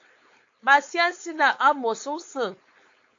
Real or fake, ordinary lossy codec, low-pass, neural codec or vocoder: fake; AAC, 48 kbps; 7.2 kHz; codec, 16 kHz, 4.8 kbps, FACodec